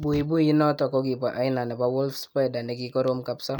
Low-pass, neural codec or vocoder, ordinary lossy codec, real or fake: none; none; none; real